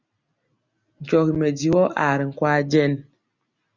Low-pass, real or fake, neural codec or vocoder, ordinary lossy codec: 7.2 kHz; real; none; Opus, 64 kbps